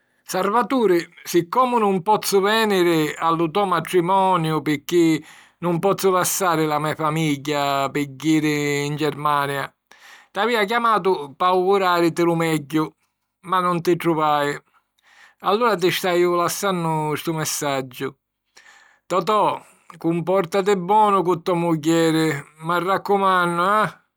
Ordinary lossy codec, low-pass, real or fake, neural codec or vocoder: none; none; real; none